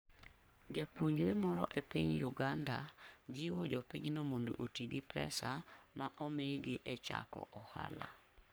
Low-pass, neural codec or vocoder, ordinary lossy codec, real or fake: none; codec, 44.1 kHz, 3.4 kbps, Pupu-Codec; none; fake